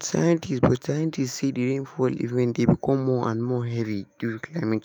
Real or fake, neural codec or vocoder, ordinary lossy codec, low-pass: fake; autoencoder, 48 kHz, 128 numbers a frame, DAC-VAE, trained on Japanese speech; none; none